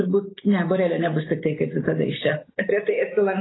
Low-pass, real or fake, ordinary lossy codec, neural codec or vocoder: 7.2 kHz; real; AAC, 16 kbps; none